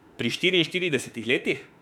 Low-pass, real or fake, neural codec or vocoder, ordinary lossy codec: 19.8 kHz; fake; autoencoder, 48 kHz, 32 numbers a frame, DAC-VAE, trained on Japanese speech; none